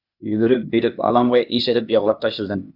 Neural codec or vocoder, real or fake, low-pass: codec, 16 kHz, 0.8 kbps, ZipCodec; fake; 5.4 kHz